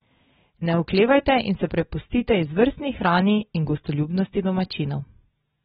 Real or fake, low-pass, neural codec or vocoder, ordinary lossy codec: real; 19.8 kHz; none; AAC, 16 kbps